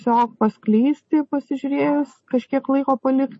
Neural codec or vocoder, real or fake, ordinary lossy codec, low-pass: none; real; MP3, 32 kbps; 10.8 kHz